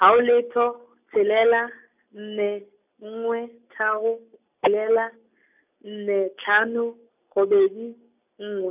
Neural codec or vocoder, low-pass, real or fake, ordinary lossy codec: none; 3.6 kHz; real; none